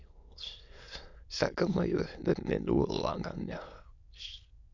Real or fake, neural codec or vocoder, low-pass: fake; autoencoder, 22.05 kHz, a latent of 192 numbers a frame, VITS, trained on many speakers; 7.2 kHz